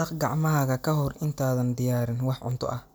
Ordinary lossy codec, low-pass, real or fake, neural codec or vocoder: none; none; real; none